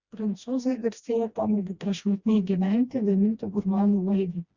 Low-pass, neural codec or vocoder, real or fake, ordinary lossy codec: 7.2 kHz; codec, 16 kHz, 1 kbps, FreqCodec, smaller model; fake; Opus, 64 kbps